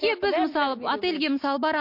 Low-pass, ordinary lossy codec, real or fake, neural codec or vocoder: 5.4 kHz; MP3, 32 kbps; real; none